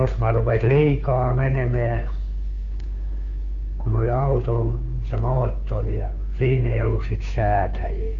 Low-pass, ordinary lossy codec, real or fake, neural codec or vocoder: 7.2 kHz; none; fake; codec, 16 kHz, 2 kbps, FunCodec, trained on Chinese and English, 25 frames a second